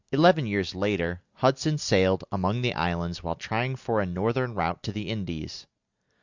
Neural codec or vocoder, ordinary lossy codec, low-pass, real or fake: none; Opus, 64 kbps; 7.2 kHz; real